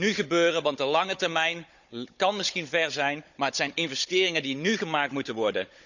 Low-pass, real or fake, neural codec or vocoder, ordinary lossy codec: 7.2 kHz; fake; codec, 16 kHz, 16 kbps, FunCodec, trained on Chinese and English, 50 frames a second; none